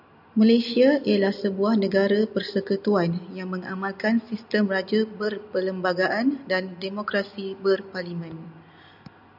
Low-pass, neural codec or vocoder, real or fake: 5.4 kHz; none; real